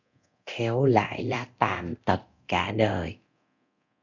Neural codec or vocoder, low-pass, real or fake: codec, 24 kHz, 0.9 kbps, DualCodec; 7.2 kHz; fake